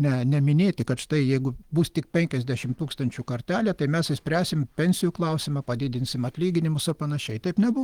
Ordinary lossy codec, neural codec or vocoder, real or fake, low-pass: Opus, 24 kbps; none; real; 19.8 kHz